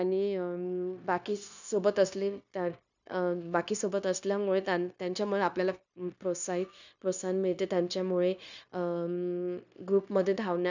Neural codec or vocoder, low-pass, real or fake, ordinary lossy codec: codec, 16 kHz, 0.9 kbps, LongCat-Audio-Codec; 7.2 kHz; fake; none